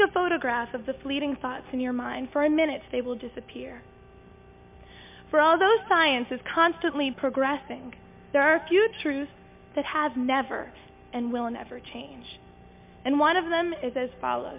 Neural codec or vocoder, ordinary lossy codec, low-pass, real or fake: none; MP3, 32 kbps; 3.6 kHz; real